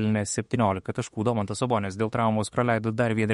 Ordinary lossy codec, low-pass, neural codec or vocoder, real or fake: MP3, 48 kbps; 19.8 kHz; autoencoder, 48 kHz, 32 numbers a frame, DAC-VAE, trained on Japanese speech; fake